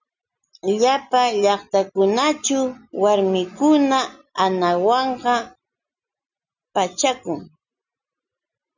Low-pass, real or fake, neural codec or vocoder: 7.2 kHz; real; none